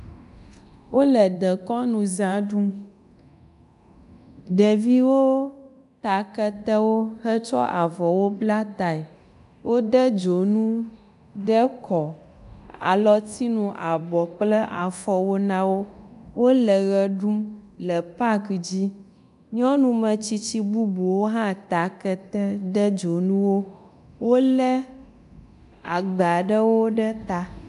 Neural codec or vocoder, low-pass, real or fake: codec, 24 kHz, 0.9 kbps, DualCodec; 10.8 kHz; fake